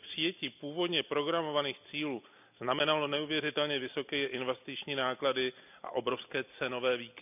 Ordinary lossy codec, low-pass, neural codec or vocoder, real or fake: none; 3.6 kHz; none; real